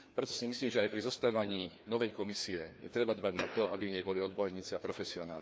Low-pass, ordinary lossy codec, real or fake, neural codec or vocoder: none; none; fake; codec, 16 kHz, 2 kbps, FreqCodec, larger model